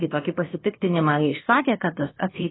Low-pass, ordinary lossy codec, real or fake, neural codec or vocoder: 7.2 kHz; AAC, 16 kbps; fake; codec, 16 kHz, about 1 kbps, DyCAST, with the encoder's durations